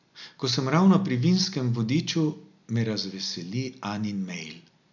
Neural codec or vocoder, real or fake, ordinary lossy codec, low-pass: none; real; none; 7.2 kHz